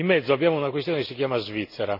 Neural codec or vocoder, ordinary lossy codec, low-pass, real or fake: none; none; 5.4 kHz; real